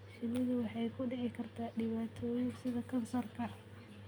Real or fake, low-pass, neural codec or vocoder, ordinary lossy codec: real; none; none; none